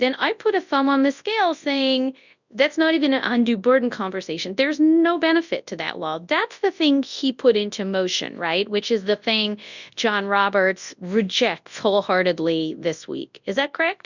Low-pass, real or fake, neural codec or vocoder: 7.2 kHz; fake; codec, 24 kHz, 0.9 kbps, WavTokenizer, large speech release